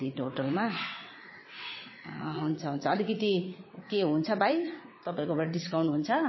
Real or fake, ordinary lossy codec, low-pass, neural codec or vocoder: fake; MP3, 24 kbps; 7.2 kHz; vocoder, 22.05 kHz, 80 mel bands, WaveNeXt